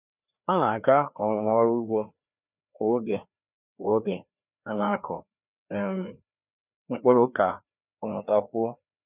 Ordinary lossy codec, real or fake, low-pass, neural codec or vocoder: none; fake; 3.6 kHz; codec, 16 kHz, 2 kbps, FreqCodec, larger model